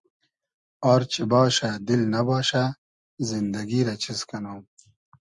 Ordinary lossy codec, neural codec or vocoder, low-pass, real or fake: Opus, 64 kbps; none; 10.8 kHz; real